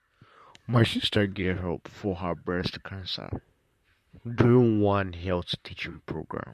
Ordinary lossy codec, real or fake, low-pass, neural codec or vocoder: MP3, 64 kbps; fake; 14.4 kHz; vocoder, 44.1 kHz, 128 mel bands, Pupu-Vocoder